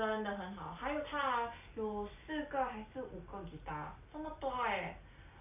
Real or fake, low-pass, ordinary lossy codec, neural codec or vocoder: real; 3.6 kHz; AAC, 24 kbps; none